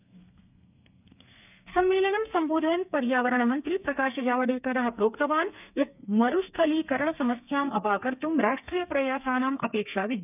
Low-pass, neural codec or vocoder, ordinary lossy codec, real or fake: 3.6 kHz; codec, 32 kHz, 1.9 kbps, SNAC; none; fake